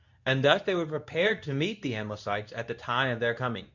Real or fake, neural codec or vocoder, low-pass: fake; codec, 24 kHz, 0.9 kbps, WavTokenizer, medium speech release version 2; 7.2 kHz